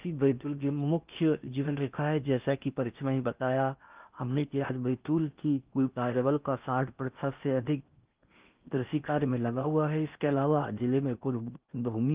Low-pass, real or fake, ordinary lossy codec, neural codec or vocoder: 3.6 kHz; fake; Opus, 24 kbps; codec, 16 kHz in and 24 kHz out, 0.6 kbps, FocalCodec, streaming, 4096 codes